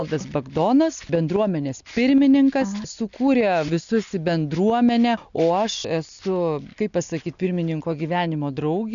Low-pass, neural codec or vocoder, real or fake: 7.2 kHz; none; real